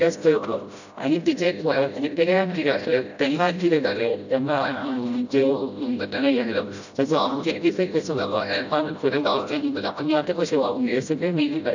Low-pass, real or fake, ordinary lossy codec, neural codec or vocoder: 7.2 kHz; fake; none; codec, 16 kHz, 0.5 kbps, FreqCodec, smaller model